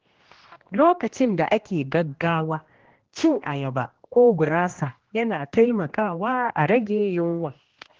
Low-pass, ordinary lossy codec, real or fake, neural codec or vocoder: 7.2 kHz; Opus, 16 kbps; fake; codec, 16 kHz, 1 kbps, X-Codec, HuBERT features, trained on general audio